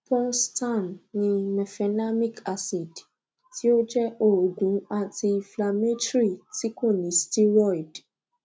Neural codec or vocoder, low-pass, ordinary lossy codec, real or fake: none; none; none; real